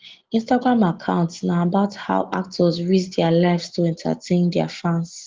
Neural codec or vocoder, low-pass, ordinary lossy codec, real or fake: none; 7.2 kHz; Opus, 16 kbps; real